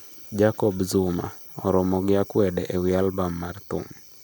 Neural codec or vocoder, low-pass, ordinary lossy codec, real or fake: none; none; none; real